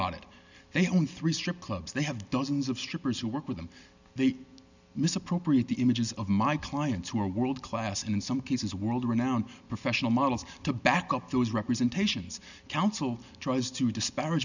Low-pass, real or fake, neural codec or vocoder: 7.2 kHz; real; none